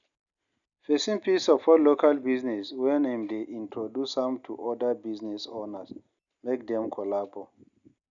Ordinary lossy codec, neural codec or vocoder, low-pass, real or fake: none; none; 7.2 kHz; real